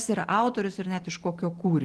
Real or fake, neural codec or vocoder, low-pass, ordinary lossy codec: real; none; 10.8 kHz; Opus, 16 kbps